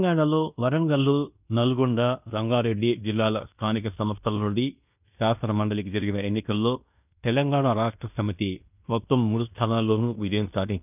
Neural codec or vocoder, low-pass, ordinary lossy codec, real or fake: codec, 16 kHz in and 24 kHz out, 0.9 kbps, LongCat-Audio-Codec, fine tuned four codebook decoder; 3.6 kHz; none; fake